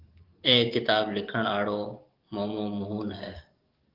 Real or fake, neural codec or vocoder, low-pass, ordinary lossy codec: fake; codec, 44.1 kHz, 7.8 kbps, DAC; 5.4 kHz; Opus, 32 kbps